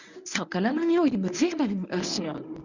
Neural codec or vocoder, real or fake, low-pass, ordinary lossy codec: codec, 24 kHz, 0.9 kbps, WavTokenizer, medium speech release version 1; fake; 7.2 kHz; none